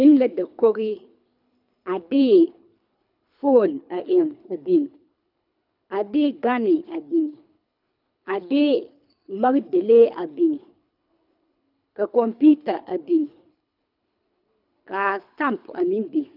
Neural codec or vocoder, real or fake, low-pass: codec, 24 kHz, 3 kbps, HILCodec; fake; 5.4 kHz